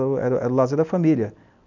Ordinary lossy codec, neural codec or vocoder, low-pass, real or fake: none; none; 7.2 kHz; real